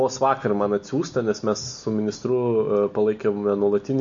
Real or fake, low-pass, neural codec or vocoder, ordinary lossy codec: real; 7.2 kHz; none; AAC, 64 kbps